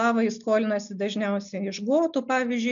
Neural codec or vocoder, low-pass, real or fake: none; 7.2 kHz; real